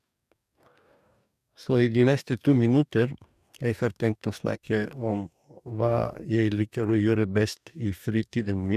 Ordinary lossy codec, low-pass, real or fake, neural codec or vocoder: none; 14.4 kHz; fake; codec, 44.1 kHz, 2.6 kbps, DAC